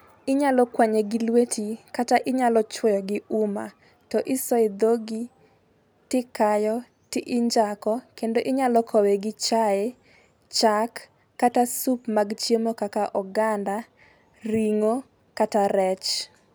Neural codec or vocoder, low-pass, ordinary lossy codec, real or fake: none; none; none; real